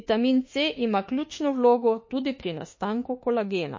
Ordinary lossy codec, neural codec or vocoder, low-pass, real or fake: MP3, 32 kbps; autoencoder, 48 kHz, 32 numbers a frame, DAC-VAE, trained on Japanese speech; 7.2 kHz; fake